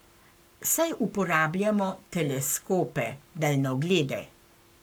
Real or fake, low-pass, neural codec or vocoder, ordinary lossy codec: fake; none; codec, 44.1 kHz, 7.8 kbps, Pupu-Codec; none